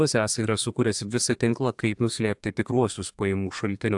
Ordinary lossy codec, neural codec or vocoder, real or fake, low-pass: AAC, 64 kbps; codec, 32 kHz, 1.9 kbps, SNAC; fake; 10.8 kHz